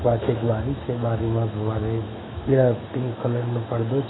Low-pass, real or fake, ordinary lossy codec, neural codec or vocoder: 7.2 kHz; fake; AAC, 16 kbps; autoencoder, 48 kHz, 128 numbers a frame, DAC-VAE, trained on Japanese speech